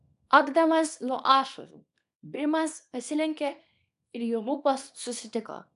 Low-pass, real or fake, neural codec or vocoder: 10.8 kHz; fake; codec, 24 kHz, 0.9 kbps, WavTokenizer, small release